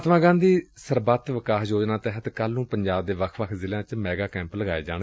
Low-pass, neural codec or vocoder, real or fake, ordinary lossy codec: none; none; real; none